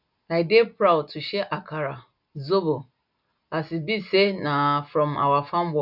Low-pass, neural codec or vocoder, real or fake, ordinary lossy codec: 5.4 kHz; none; real; none